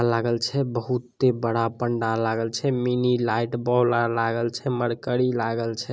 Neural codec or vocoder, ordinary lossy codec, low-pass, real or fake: none; none; none; real